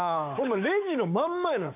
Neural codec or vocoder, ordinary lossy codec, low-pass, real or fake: codec, 16 kHz, 6 kbps, DAC; none; 3.6 kHz; fake